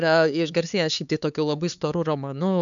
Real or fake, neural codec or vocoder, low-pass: fake; codec, 16 kHz, 4 kbps, X-Codec, HuBERT features, trained on LibriSpeech; 7.2 kHz